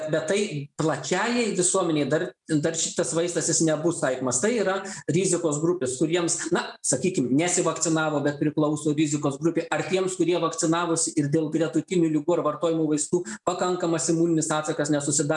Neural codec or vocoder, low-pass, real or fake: none; 10.8 kHz; real